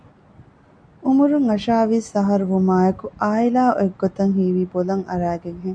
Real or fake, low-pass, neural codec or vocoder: real; 9.9 kHz; none